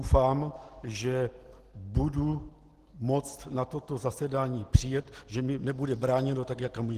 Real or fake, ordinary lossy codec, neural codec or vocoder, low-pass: real; Opus, 16 kbps; none; 14.4 kHz